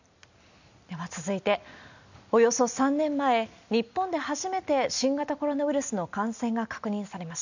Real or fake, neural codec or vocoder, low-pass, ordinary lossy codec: real; none; 7.2 kHz; none